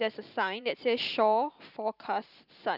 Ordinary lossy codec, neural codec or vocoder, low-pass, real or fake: none; vocoder, 44.1 kHz, 128 mel bands every 512 samples, BigVGAN v2; 5.4 kHz; fake